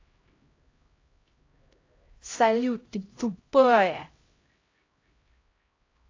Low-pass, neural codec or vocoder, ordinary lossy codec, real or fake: 7.2 kHz; codec, 16 kHz, 0.5 kbps, X-Codec, HuBERT features, trained on LibriSpeech; AAC, 32 kbps; fake